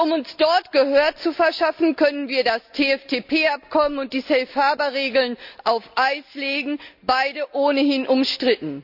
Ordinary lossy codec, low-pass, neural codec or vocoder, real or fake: none; 5.4 kHz; none; real